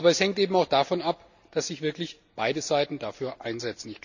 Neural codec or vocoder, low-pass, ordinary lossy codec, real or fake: none; 7.2 kHz; none; real